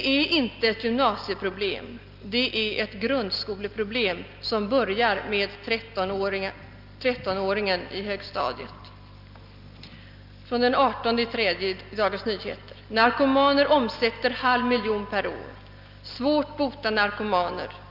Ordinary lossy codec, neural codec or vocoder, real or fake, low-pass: Opus, 24 kbps; none; real; 5.4 kHz